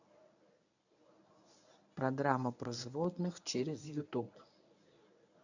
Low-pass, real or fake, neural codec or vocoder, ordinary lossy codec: 7.2 kHz; fake; codec, 24 kHz, 0.9 kbps, WavTokenizer, medium speech release version 1; none